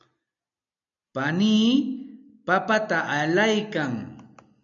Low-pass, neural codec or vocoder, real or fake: 7.2 kHz; none; real